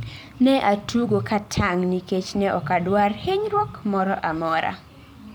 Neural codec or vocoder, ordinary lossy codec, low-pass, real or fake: vocoder, 44.1 kHz, 128 mel bands every 256 samples, BigVGAN v2; none; none; fake